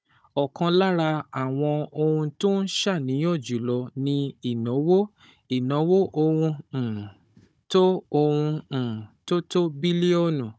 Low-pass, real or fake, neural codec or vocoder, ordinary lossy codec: none; fake; codec, 16 kHz, 16 kbps, FunCodec, trained on Chinese and English, 50 frames a second; none